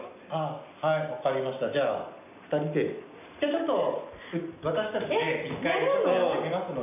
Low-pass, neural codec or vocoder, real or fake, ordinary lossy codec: 3.6 kHz; none; real; none